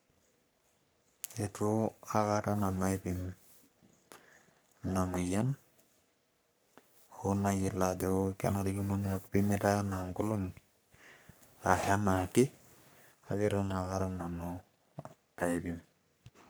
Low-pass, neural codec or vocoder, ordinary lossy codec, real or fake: none; codec, 44.1 kHz, 3.4 kbps, Pupu-Codec; none; fake